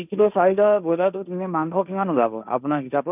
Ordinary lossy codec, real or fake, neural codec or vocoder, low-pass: none; fake; codec, 16 kHz, 1.1 kbps, Voila-Tokenizer; 3.6 kHz